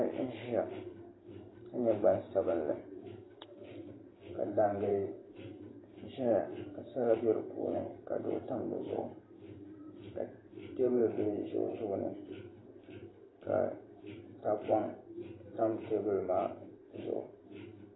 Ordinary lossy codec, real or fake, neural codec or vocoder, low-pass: AAC, 16 kbps; fake; vocoder, 44.1 kHz, 128 mel bands every 512 samples, BigVGAN v2; 7.2 kHz